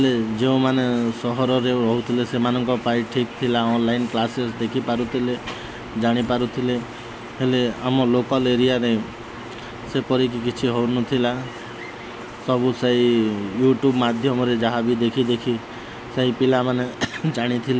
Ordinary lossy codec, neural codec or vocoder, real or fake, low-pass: none; none; real; none